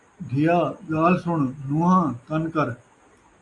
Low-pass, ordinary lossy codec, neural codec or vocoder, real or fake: 10.8 kHz; AAC, 48 kbps; none; real